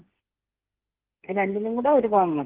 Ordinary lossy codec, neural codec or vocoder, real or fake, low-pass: Opus, 16 kbps; codec, 16 kHz, 8 kbps, FreqCodec, smaller model; fake; 3.6 kHz